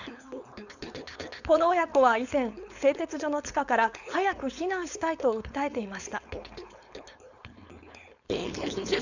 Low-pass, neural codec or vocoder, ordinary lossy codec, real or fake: 7.2 kHz; codec, 16 kHz, 4.8 kbps, FACodec; none; fake